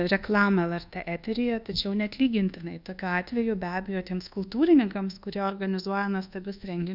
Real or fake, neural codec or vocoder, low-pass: fake; codec, 24 kHz, 1.2 kbps, DualCodec; 5.4 kHz